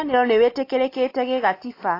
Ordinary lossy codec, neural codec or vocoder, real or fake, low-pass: AAC, 32 kbps; none; real; 5.4 kHz